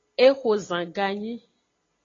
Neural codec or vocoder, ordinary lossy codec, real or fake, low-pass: none; AAC, 32 kbps; real; 7.2 kHz